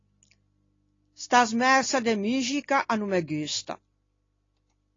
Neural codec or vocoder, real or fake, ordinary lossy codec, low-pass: none; real; AAC, 32 kbps; 7.2 kHz